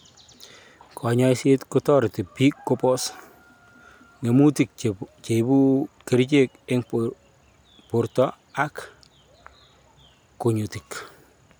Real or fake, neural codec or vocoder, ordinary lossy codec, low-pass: real; none; none; none